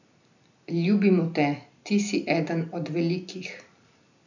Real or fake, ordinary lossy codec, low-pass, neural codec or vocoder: real; none; 7.2 kHz; none